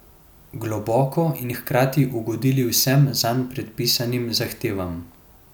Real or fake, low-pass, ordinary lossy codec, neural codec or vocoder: real; none; none; none